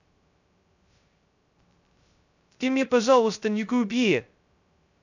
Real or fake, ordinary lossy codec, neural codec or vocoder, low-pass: fake; none; codec, 16 kHz, 0.2 kbps, FocalCodec; 7.2 kHz